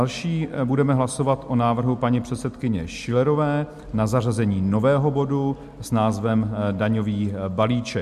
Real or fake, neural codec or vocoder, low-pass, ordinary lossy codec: real; none; 14.4 kHz; MP3, 64 kbps